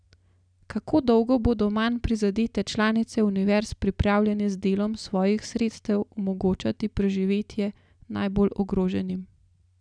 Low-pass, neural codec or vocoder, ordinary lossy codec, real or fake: 9.9 kHz; none; none; real